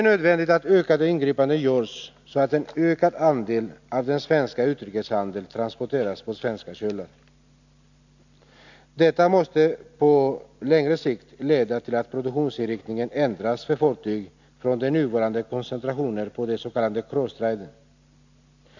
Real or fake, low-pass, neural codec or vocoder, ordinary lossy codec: real; 7.2 kHz; none; none